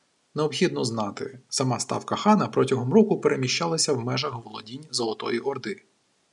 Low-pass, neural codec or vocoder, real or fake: 10.8 kHz; none; real